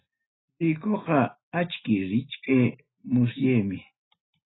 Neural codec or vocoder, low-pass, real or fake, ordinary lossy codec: none; 7.2 kHz; real; AAC, 16 kbps